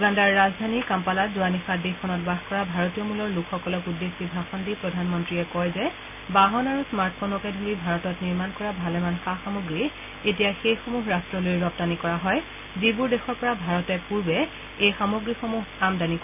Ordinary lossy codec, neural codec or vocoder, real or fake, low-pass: none; none; real; 3.6 kHz